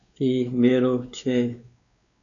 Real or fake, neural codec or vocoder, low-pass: fake; codec, 16 kHz, 2 kbps, X-Codec, WavLM features, trained on Multilingual LibriSpeech; 7.2 kHz